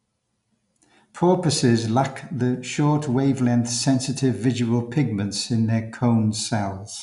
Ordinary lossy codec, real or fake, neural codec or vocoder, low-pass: none; real; none; 10.8 kHz